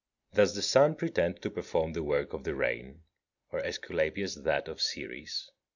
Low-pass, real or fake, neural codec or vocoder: 7.2 kHz; real; none